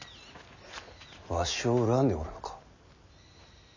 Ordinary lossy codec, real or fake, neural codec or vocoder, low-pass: none; real; none; 7.2 kHz